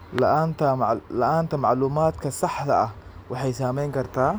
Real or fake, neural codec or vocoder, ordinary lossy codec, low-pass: real; none; none; none